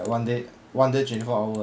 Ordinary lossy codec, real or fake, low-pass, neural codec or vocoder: none; real; none; none